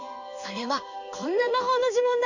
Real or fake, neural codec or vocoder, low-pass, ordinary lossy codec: fake; codec, 16 kHz in and 24 kHz out, 1 kbps, XY-Tokenizer; 7.2 kHz; none